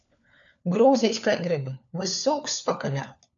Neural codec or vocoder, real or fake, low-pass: codec, 16 kHz, 4 kbps, FunCodec, trained on LibriTTS, 50 frames a second; fake; 7.2 kHz